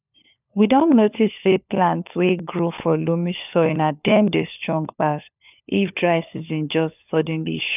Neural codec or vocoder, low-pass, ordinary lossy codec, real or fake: codec, 16 kHz, 4 kbps, FunCodec, trained on LibriTTS, 50 frames a second; 3.6 kHz; none; fake